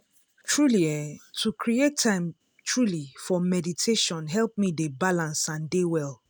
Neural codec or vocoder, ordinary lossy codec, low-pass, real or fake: none; none; none; real